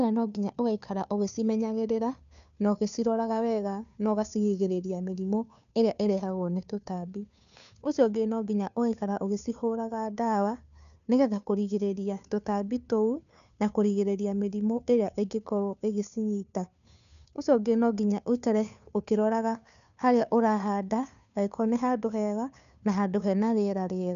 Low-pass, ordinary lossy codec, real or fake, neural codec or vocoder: 7.2 kHz; none; fake; codec, 16 kHz, 2 kbps, FunCodec, trained on Chinese and English, 25 frames a second